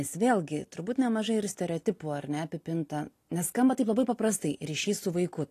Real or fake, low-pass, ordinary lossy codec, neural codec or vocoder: real; 14.4 kHz; AAC, 48 kbps; none